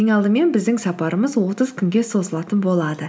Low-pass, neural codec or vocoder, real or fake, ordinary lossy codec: none; none; real; none